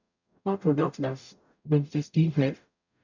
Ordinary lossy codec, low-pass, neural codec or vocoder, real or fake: none; 7.2 kHz; codec, 44.1 kHz, 0.9 kbps, DAC; fake